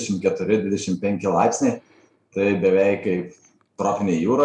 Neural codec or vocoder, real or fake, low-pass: none; real; 10.8 kHz